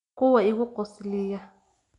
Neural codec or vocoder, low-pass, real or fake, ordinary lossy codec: none; 10.8 kHz; real; none